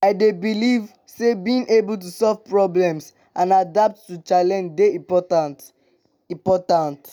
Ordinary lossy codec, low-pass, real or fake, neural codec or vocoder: none; none; real; none